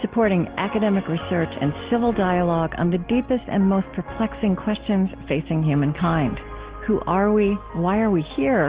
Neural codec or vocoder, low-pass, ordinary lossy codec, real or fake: none; 3.6 kHz; Opus, 16 kbps; real